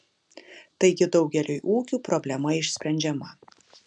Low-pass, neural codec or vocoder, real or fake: 10.8 kHz; none; real